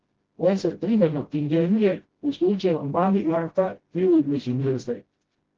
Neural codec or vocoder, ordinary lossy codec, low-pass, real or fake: codec, 16 kHz, 0.5 kbps, FreqCodec, smaller model; Opus, 16 kbps; 7.2 kHz; fake